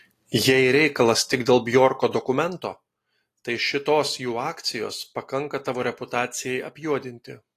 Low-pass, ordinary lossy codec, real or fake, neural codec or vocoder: 14.4 kHz; AAC, 48 kbps; real; none